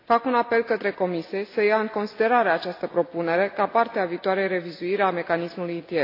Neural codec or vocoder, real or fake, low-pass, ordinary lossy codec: none; real; 5.4 kHz; AAC, 32 kbps